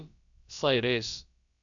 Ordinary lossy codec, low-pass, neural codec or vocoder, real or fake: none; 7.2 kHz; codec, 16 kHz, about 1 kbps, DyCAST, with the encoder's durations; fake